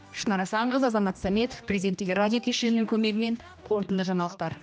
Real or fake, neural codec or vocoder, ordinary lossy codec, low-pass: fake; codec, 16 kHz, 1 kbps, X-Codec, HuBERT features, trained on general audio; none; none